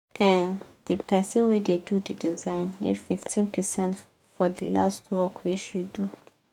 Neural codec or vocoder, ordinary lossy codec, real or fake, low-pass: codec, 44.1 kHz, 2.6 kbps, DAC; none; fake; 19.8 kHz